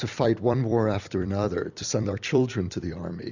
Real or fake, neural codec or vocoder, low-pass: fake; vocoder, 44.1 kHz, 128 mel bands every 256 samples, BigVGAN v2; 7.2 kHz